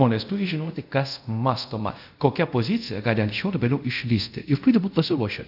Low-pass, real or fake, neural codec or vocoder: 5.4 kHz; fake; codec, 24 kHz, 0.5 kbps, DualCodec